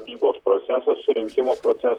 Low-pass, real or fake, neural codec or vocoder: 19.8 kHz; fake; vocoder, 44.1 kHz, 128 mel bands, Pupu-Vocoder